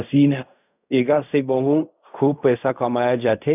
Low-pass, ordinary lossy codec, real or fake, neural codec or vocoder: 3.6 kHz; none; fake; codec, 16 kHz in and 24 kHz out, 0.4 kbps, LongCat-Audio-Codec, fine tuned four codebook decoder